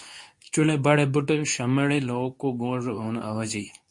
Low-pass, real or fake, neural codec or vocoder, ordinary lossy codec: 10.8 kHz; fake; codec, 24 kHz, 0.9 kbps, WavTokenizer, medium speech release version 2; MP3, 48 kbps